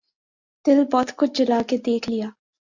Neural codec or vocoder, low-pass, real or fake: none; 7.2 kHz; real